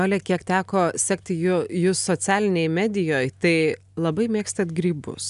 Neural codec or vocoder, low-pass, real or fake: none; 10.8 kHz; real